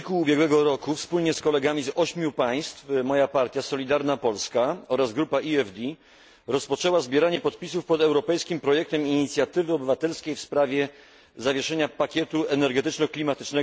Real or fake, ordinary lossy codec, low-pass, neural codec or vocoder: real; none; none; none